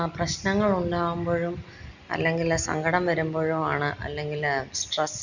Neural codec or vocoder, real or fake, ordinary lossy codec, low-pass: none; real; none; 7.2 kHz